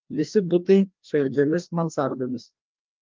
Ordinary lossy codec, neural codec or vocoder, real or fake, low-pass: Opus, 24 kbps; codec, 16 kHz, 1 kbps, FreqCodec, larger model; fake; 7.2 kHz